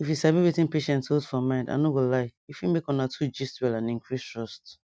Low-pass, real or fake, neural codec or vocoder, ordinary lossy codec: none; real; none; none